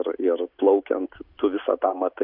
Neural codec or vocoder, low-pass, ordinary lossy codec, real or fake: none; 5.4 kHz; MP3, 48 kbps; real